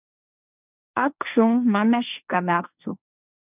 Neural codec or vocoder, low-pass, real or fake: codec, 16 kHz, 1.1 kbps, Voila-Tokenizer; 3.6 kHz; fake